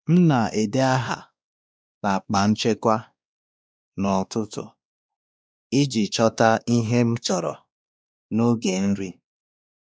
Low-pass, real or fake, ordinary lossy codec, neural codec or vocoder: none; fake; none; codec, 16 kHz, 2 kbps, X-Codec, WavLM features, trained on Multilingual LibriSpeech